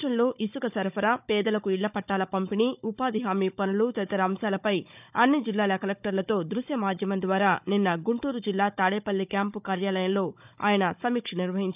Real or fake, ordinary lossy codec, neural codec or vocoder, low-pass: fake; none; codec, 16 kHz, 16 kbps, FunCodec, trained on Chinese and English, 50 frames a second; 3.6 kHz